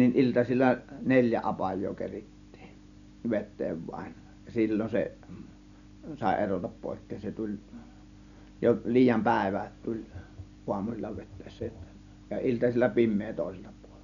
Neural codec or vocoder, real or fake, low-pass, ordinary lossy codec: none; real; 7.2 kHz; none